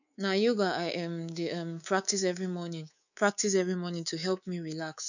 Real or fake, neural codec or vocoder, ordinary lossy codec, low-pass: fake; codec, 24 kHz, 3.1 kbps, DualCodec; none; 7.2 kHz